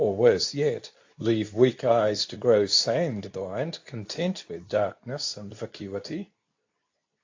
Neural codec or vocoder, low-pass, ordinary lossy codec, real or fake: codec, 24 kHz, 0.9 kbps, WavTokenizer, medium speech release version 2; 7.2 kHz; AAC, 48 kbps; fake